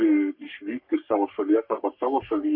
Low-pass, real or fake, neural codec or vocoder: 5.4 kHz; fake; codec, 44.1 kHz, 3.4 kbps, Pupu-Codec